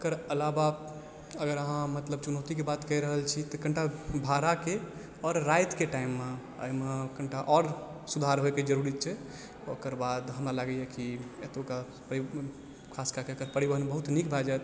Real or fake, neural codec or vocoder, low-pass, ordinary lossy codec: real; none; none; none